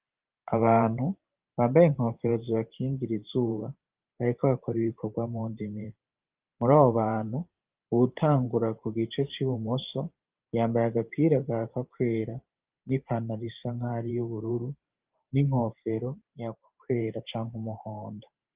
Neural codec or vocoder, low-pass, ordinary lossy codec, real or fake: vocoder, 44.1 kHz, 128 mel bands every 512 samples, BigVGAN v2; 3.6 kHz; Opus, 32 kbps; fake